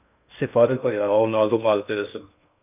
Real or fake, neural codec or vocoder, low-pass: fake; codec, 16 kHz in and 24 kHz out, 0.6 kbps, FocalCodec, streaming, 2048 codes; 3.6 kHz